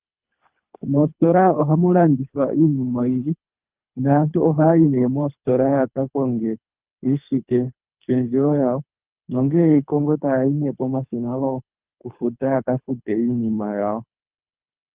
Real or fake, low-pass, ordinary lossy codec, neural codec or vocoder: fake; 3.6 kHz; Opus, 24 kbps; codec, 24 kHz, 3 kbps, HILCodec